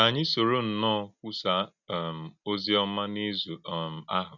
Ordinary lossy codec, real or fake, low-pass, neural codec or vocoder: none; real; 7.2 kHz; none